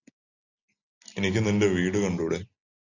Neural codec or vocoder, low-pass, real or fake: none; 7.2 kHz; real